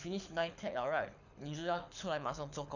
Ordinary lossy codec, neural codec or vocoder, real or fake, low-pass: none; codec, 24 kHz, 6 kbps, HILCodec; fake; 7.2 kHz